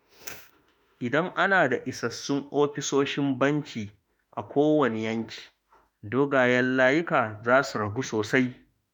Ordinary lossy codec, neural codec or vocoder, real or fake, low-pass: none; autoencoder, 48 kHz, 32 numbers a frame, DAC-VAE, trained on Japanese speech; fake; none